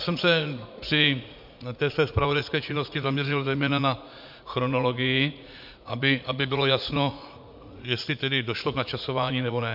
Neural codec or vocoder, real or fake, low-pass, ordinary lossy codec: vocoder, 44.1 kHz, 80 mel bands, Vocos; fake; 5.4 kHz; MP3, 48 kbps